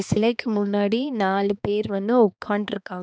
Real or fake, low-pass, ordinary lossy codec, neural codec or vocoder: fake; none; none; codec, 16 kHz, 2 kbps, X-Codec, HuBERT features, trained on balanced general audio